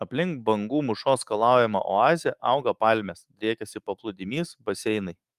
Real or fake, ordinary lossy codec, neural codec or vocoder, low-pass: fake; Opus, 32 kbps; autoencoder, 48 kHz, 128 numbers a frame, DAC-VAE, trained on Japanese speech; 14.4 kHz